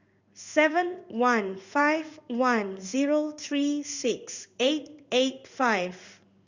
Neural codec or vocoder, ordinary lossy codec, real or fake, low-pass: codec, 16 kHz in and 24 kHz out, 1 kbps, XY-Tokenizer; Opus, 64 kbps; fake; 7.2 kHz